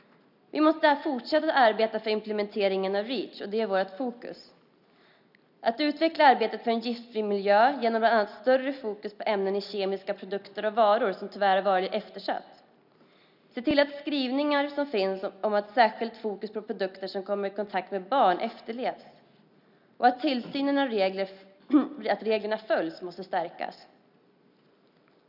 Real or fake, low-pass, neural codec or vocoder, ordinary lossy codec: real; 5.4 kHz; none; none